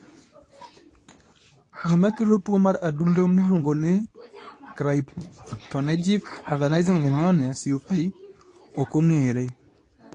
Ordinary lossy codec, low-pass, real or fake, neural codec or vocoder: AAC, 48 kbps; 10.8 kHz; fake; codec, 24 kHz, 0.9 kbps, WavTokenizer, medium speech release version 2